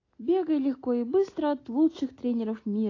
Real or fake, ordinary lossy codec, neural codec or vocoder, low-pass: real; AAC, 32 kbps; none; 7.2 kHz